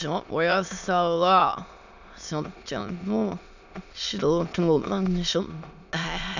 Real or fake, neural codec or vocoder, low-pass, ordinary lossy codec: fake; autoencoder, 22.05 kHz, a latent of 192 numbers a frame, VITS, trained on many speakers; 7.2 kHz; none